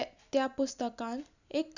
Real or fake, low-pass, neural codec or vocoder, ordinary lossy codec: real; 7.2 kHz; none; none